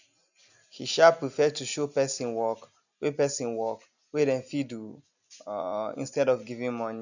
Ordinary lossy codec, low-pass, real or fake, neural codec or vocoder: none; 7.2 kHz; real; none